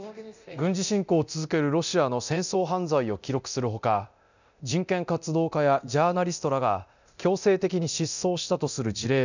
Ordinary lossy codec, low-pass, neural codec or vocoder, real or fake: none; 7.2 kHz; codec, 24 kHz, 0.9 kbps, DualCodec; fake